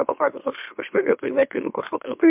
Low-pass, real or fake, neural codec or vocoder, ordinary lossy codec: 3.6 kHz; fake; autoencoder, 44.1 kHz, a latent of 192 numbers a frame, MeloTTS; MP3, 32 kbps